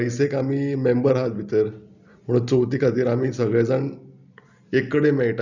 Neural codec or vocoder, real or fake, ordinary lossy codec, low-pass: none; real; none; 7.2 kHz